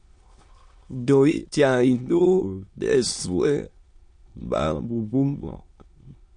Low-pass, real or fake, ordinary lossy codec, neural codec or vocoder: 9.9 kHz; fake; MP3, 48 kbps; autoencoder, 22.05 kHz, a latent of 192 numbers a frame, VITS, trained on many speakers